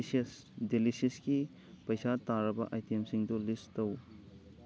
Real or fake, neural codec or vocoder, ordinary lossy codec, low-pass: real; none; none; none